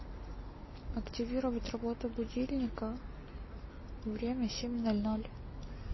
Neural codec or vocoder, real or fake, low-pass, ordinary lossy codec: none; real; 7.2 kHz; MP3, 24 kbps